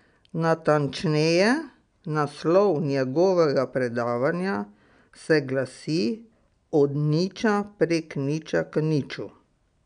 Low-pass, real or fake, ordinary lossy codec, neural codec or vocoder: 9.9 kHz; real; none; none